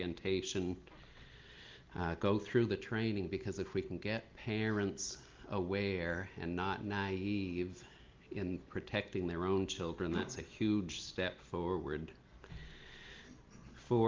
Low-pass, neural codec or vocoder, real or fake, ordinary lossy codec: 7.2 kHz; none; real; Opus, 32 kbps